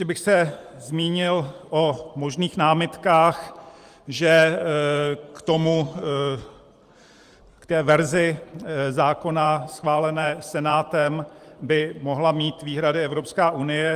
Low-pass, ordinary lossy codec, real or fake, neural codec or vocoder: 14.4 kHz; Opus, 32 kbps; fake; vocoder, 44.1 kHz, 128 mel bands every 512 samples, BigVGAN v2